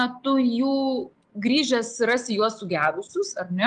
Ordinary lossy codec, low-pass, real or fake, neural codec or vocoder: Opus, 24 kbps; 9.9 kHz; real; none